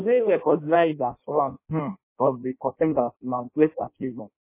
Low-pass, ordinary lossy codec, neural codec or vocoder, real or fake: 3.6 kHz; MP3, 24 kbps; codec, 16 kHz in and 24 kHz out, 0.6 kbps, FireRedTTS-2 codec; fake